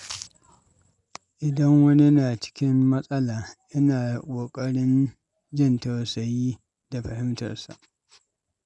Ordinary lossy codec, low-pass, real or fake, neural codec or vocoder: none; 10.8 kHz; real; none